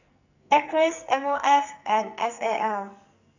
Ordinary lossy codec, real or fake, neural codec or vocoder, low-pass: none; fake; codec, 44.1 kHz, 2.6 kbps, SNAC; 7.2 kHz